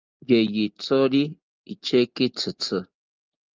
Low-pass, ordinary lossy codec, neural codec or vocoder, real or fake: 7.2 kHz; Opus, 24 kbps; none; real